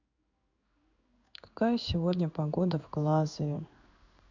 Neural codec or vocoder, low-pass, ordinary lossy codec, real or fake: codec, 16 kHz in and 24 kHz out, 1 kbps, XY-Tokenizer; 7.2 kHz; none; fake